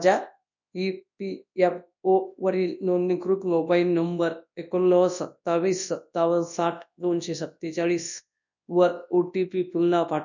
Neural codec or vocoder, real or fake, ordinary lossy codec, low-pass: codec, 24 kHz, 0.9 kbps, WavTokenizer, large speech release; fake; none; 7.2 kHz